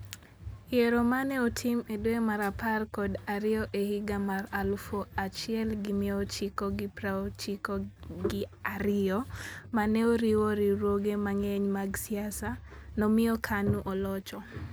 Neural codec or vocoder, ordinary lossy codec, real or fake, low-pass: none; none; real; none